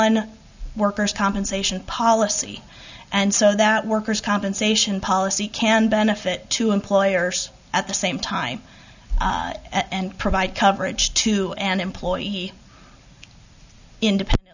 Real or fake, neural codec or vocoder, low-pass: real; none; 7.2 kHz